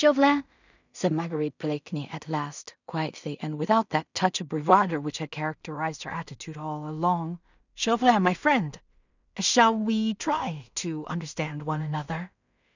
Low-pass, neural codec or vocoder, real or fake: 7.2 kHz; codec, 16 kHz in and 24 kHz out, 0.4 kbps, LongCat-Audio-Codec, two codebook decoder; fake